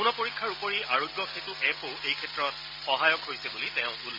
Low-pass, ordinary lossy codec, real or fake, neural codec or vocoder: 5.4 kHz; MP3, 32 kbps; real; none